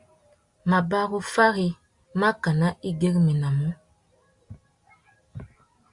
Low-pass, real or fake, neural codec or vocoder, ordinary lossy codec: 10.8 kHz; real; none; Opus, 64 kbps